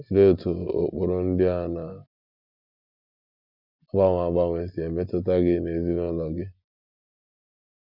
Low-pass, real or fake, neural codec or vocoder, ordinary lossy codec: 5.4 kHz; real; none; none